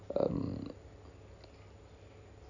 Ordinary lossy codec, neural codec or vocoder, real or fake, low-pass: none; none; real; 7.2 kHz